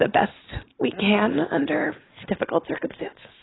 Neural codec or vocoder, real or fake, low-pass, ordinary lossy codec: none; real; 7.2 kHz; AAC, 16 kbps